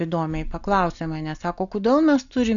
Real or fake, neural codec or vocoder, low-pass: real; none; 7.2 kHz